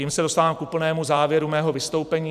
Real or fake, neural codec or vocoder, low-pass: fake; vocoder, 44.1 kHz, 128 mel bands every 512 samples, BigVGAN v2; 14.4 kHz